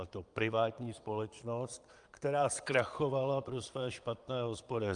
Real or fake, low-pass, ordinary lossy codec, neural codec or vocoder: fake; 9.9 kHz; Opus, 32 kbps; vocoder, 22.05 kHz, 80 mel bands, Vocos